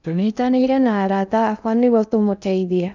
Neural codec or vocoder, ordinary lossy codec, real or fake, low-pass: codec, 16 kHz in and 24 kHz out, 0.6 kbps, FocalCodec, streaming, 2048 codes; none; fake; 7.2 kHz